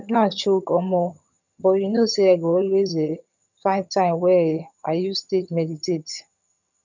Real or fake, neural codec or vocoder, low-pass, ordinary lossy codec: fake; vocoder, 22.05 kHz, 80 mel bands, HiFi-GAN; 7.2 kHz; none